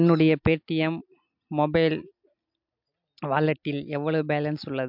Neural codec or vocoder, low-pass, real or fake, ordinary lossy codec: none; 5.4 kHz; real; MP3, 48 kbps